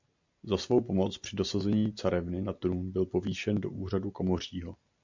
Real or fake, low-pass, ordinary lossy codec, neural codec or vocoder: real; 7.2 kHz; MP3, 64 kbps; none